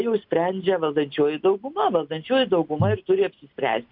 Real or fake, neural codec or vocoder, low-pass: real; none; 5.4 kHz